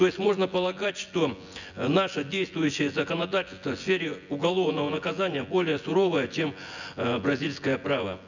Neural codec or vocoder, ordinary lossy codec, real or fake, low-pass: vocoder, 24 kHz, 100 mel bands, Vocos; none; fake; 7.2 kHz